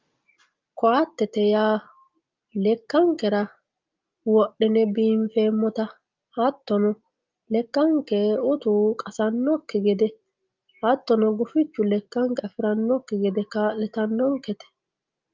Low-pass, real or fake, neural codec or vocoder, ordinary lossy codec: 7.2 kHz; real; none; Opus, 24 kbps